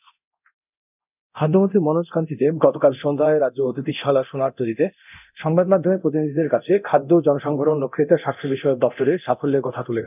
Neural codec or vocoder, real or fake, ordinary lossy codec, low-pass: codec, 24 kHz, 0.9 kbps, DualCodec; fake; none; 3.6 kHz